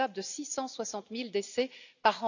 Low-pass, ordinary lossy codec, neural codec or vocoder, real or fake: 7.2 kHz; none; none; real